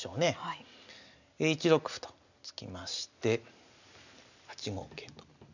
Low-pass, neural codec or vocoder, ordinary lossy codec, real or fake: 7.2 kHz; none; none; real